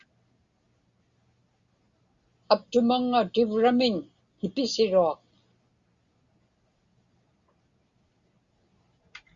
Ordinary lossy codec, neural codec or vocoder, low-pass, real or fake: Opus, 64 kbps; none; 7.2 kHz; real